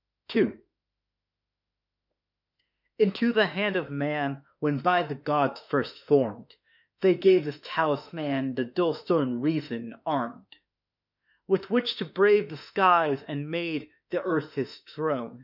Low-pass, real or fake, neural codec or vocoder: 5.4 kHz; fake; autoencoder, 48 kHz, 32 numbers a frame, DAC-VAE, trained on Japanese speech